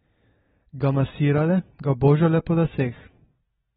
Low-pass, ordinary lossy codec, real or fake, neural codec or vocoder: 7.2 kHz; AAC, 16 kbps; real; none